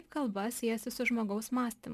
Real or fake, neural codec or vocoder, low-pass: real; none; 14.4 kHz